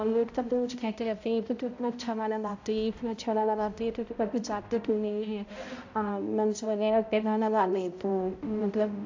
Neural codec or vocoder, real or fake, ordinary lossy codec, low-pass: codec, 16 kHz, 0.5 kbps, X-Codec, HuBERT features, trained on balanced general audio; fake; AAC, 48 kbps; 7.2 kHz